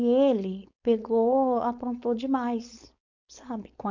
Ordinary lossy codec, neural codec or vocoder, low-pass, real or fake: none; codec, 16 kHz, 4.8 kbps, FACodec; 7.2 kHz; fake